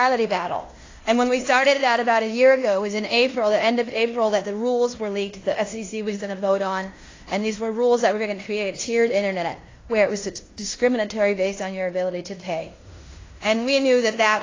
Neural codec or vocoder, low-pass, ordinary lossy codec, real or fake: codec, 16 kHz in and 24 kHz out, 0.9 kbps, LongCat-Audio-Codec, fine tuned four codebook decoder; 7.2 kHz; AAC, 32 kbps; fake